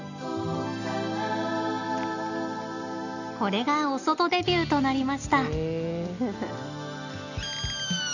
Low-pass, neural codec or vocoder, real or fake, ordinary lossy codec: 7.2 kHz; none; real; none